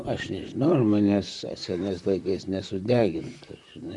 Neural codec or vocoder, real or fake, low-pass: vocoder, 44.1 kHz, 128 mel bands, Pupu-Vocoder; fake; 10.8 kHz